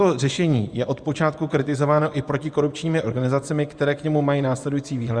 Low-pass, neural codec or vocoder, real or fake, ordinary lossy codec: 9.9 kHz; vocoder, 24 kHz, 100 mel bands, Vocos; fake; Opus, 64 kbps